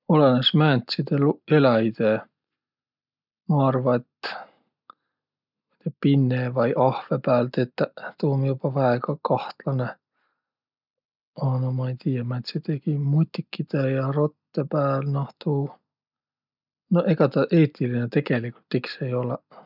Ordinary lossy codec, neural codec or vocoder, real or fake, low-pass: none; none; real; 5.4 kHz